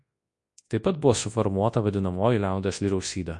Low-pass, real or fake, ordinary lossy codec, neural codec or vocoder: 10.8 kHz; fake; MP3, 48 kbps; codec, 24 kHz, 0.9 kbps, WavTokenizer, large speech release